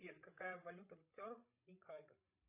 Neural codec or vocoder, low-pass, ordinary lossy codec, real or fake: codec, 16 kHz, 8 kbps, FreqCodec, larger model; 3.6 kHz; AAC, 32 kbps; fake